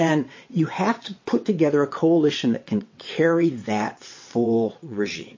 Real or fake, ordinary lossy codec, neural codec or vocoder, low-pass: fake; MP3, 32 kbps; vocoder, 22.05 kHz, 80 mel bands, WaveNeXt; 7.2 kHz